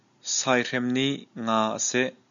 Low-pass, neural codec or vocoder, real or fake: 7.2 kHz; none; real